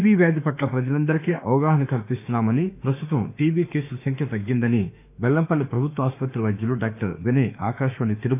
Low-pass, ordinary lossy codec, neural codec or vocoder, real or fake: 3.6 kHz; none; autoencoder, 48 kHz, 32 numbers a frame, DAC-VAE, trained on Japanese speech; fake